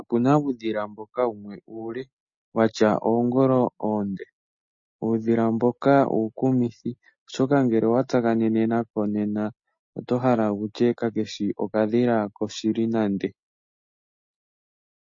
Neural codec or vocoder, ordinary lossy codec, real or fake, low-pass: none; MP3, 32 kbps; real; 7.2 kHz